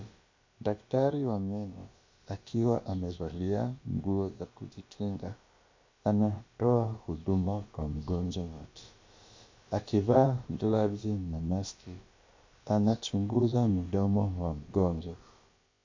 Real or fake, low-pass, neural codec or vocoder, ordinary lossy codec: fake; 7.2 kHz; codec, 16 kHz, about 1 kbps, DyCAST, with the encoder's durations; MP3, 48 kbps